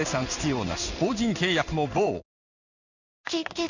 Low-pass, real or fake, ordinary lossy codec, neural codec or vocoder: 7.2 kHz; fake; none; codec, 16 kHz in and 24 kHz out, 1 kbps, XY-Tokenizer